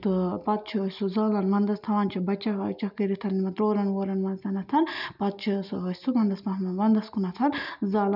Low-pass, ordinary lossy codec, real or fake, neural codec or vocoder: 5.4 kHz; none; real; none